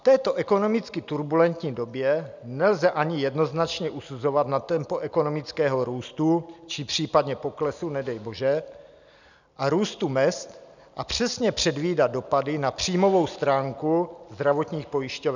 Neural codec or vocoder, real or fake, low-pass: none; real; 7.2 kHz